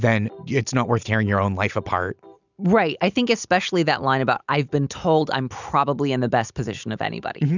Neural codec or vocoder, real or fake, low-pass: none; real; 7.2 kHz